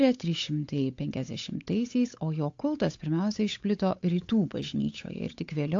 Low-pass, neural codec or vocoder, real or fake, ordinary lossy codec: 7.2 kHz; codec, 16 kHz, 8 kbps, FunCodec, trained on Chinese and English, 25 frames a second; fake; AAC, 48 kbps